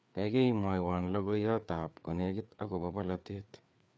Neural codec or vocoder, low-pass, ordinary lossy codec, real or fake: codec, 16 kHz, 4 kbps, FreqCodec, larger model; none; none; fake